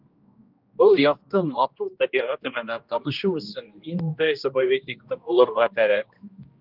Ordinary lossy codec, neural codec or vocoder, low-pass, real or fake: Opus, 32 kbps; codec, 16 kHz, 1 kbps, X-Codec, HuBERT features, trained on general audio; 5.4 kHz; fake